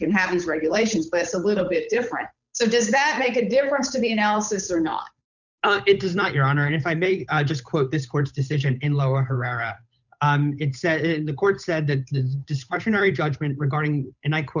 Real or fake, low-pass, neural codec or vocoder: fake; 7.2 kHz; codec, 16 kHz, 8 kbps, FunCodec, trained on Chinese and English, 25 frames a second